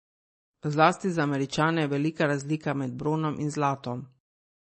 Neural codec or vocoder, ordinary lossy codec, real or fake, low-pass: none; MP3, 32 kbps; real; 10.8 kHz